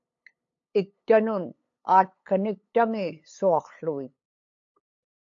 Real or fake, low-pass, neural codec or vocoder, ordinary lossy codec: fake; 7.2 kHz; codec, 16 kHz, 8 kbps, FunCodec, trained on LibriTTS, 25 frames a second; AAC, 48 kbps